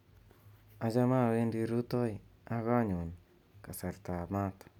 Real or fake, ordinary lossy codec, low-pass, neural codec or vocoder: real; none; 19.8 kHz; none